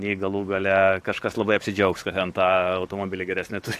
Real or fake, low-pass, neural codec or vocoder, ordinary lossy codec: fake; 14.4 kHz; codec, 44.1 kHz, 7.8 kbps, DAC; AAC, 64 kbps